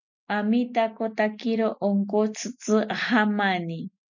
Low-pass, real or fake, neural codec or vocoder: 7.2 kHz; real; none